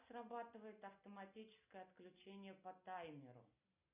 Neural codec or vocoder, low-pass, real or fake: none; 3.6 kHz; real